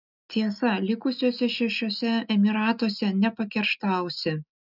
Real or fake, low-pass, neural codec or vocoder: real; 5.4 kHz; none